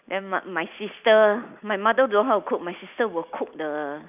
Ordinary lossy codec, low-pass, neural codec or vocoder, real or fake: none; 3.6 kHz; none; real